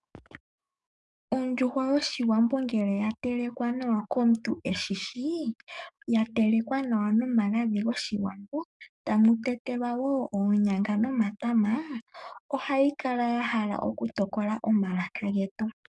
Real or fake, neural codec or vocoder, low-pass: fake; codec, 44.1 kHz, 7.8 kbps, DAC; 10.8 kHz